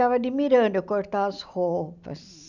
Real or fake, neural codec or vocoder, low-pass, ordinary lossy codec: real; none; 7.2 kHz; none